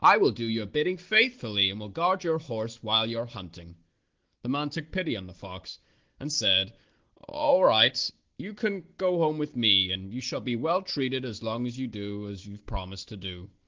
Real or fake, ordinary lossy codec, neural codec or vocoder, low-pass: real; Opus, 16 kbps; none; 7.2 kHz